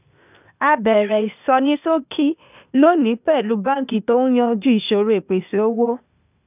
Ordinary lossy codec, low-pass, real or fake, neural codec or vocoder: none; 3.6 kHz; fake; codec, 16 kHz, 0.8 kbps, ZipCodec